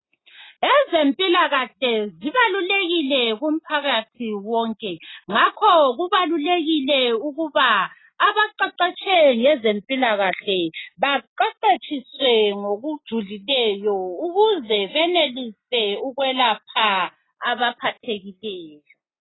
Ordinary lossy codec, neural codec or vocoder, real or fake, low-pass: AAC, 16 kbps; none; real; 7.2 kHz